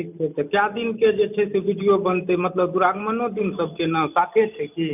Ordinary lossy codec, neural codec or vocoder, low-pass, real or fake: none; none; 3.6 kHz; real